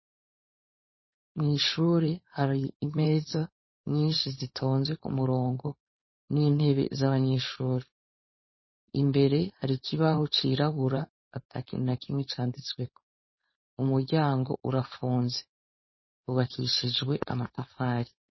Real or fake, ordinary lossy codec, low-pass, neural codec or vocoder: fake; MP3, 24 kbps; 7.2 kHz; codec, 16 kHz, 4.8 kbps, FACodec